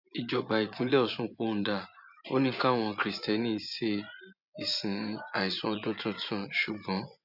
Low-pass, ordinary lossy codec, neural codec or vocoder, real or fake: 5.4 kHz; none; vocoder, 24 kHz, 100 mel bands, Vocos; fake